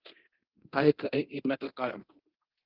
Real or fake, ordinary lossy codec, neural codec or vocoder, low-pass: fake; Opus, 32 kbps; codec, 24 kHz, 0.9 kbps, DualCodec; 5.4 kHz